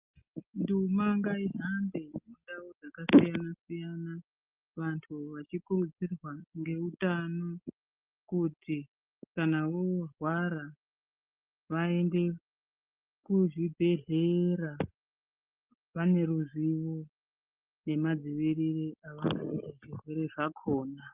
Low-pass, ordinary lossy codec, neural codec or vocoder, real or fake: 3.6 kHz; Opus, 24 kbps; none; real